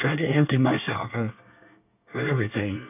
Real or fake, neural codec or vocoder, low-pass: fake; codec, 24 kHz, 1 kbps, SNAC; 3.6 kHz